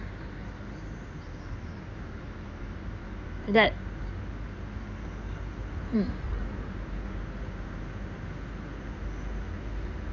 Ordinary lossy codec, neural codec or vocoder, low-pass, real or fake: none; codec, 16 kHz in and 24 kHz out, 2.2 kbps, FireRedTTS-2 codec; 7.2 kHz; fake